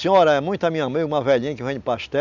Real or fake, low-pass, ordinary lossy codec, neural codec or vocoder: real; 7.2 kHz; none; none